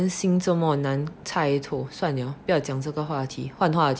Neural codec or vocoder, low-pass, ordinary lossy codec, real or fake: none; none; none; real